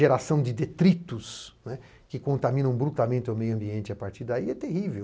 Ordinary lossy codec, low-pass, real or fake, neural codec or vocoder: none; none; real; none